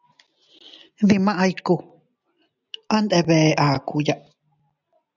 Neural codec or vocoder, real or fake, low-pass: none; real; 7.2 kHz